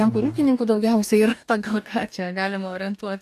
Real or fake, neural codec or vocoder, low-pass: fake; codec, 44.1 kHz, 2.6 kbps, DAC; 14.4 kHz